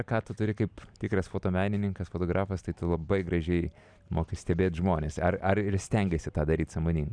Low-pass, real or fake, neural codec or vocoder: 9.9 kHz; real; none